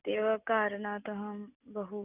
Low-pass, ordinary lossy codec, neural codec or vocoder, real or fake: 3.6 kHz; none; none; real